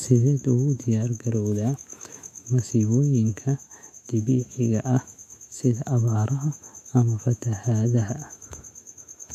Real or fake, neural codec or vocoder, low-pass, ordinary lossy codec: fake; autoencoder, 48 kHz, 128 numbers a frame, DAC-VAE, trained on Japanese speech; 14.4 kHz; none